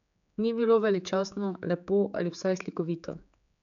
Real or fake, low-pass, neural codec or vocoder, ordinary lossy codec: fake; 7.2 kHz; codec, 16 kHz, 4 kbps, X-Codec, HuBERT features, trained on general audio; none